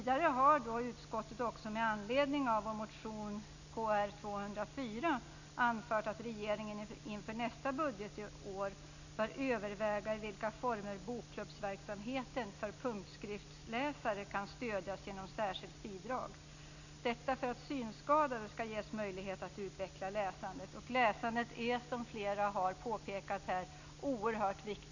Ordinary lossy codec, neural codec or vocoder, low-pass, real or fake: none; none; 7.2 kHz; real